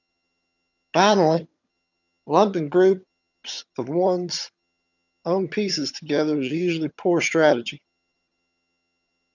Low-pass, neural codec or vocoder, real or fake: 7.2 kHz; vocoder, 22.05 kHz, 80 mel bands, HiFi-GAN; fake